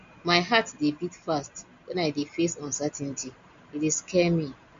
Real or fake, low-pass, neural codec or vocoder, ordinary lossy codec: real; 7.2 kHz; none; MP3, 48 kbps